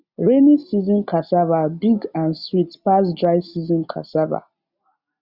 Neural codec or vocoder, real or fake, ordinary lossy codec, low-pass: none; real; Opus, 64 kbps; 5.4 kHz